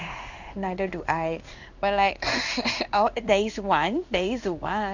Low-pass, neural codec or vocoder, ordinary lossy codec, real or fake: 7.2 kHz; codec, 16 kHz in and 24 kHz out, 1 kbps, XY-Tokenizer; none; fake